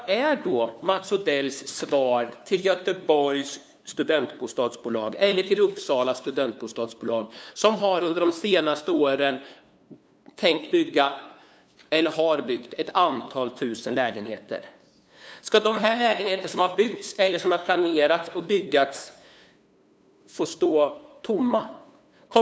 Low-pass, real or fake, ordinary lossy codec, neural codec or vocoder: none; fake; none; codec, 16 kHz, 2 kbps, FunCodec, trained on LibriTTS, 25 frames a second